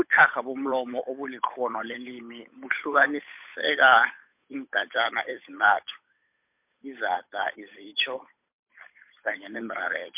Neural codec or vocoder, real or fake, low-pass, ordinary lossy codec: codec, 16 kHz, 8 kbps, FunCodec, trained on Chinese and English, 25 frames a second; fake; 3.6 kHz; MP3, 32 kbps